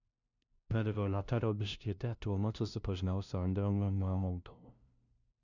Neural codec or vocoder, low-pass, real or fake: codec, 16 kHz, 0.5 kbps, FunCodec, trained on LibriTTS, 25 frames a second; 7.2 kHz; fake